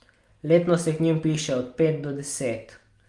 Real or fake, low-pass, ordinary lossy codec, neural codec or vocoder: real; 10.8 kHz; Opus, 32 kbps; none